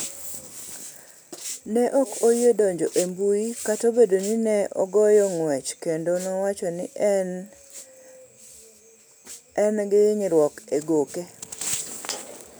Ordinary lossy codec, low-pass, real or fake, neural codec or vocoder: none; none; real; none